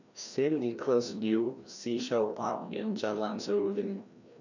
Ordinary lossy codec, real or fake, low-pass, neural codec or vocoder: none; fake; 7.2 kHz; codec, 16 kHz, 1 kbps, FreqCodec, larger model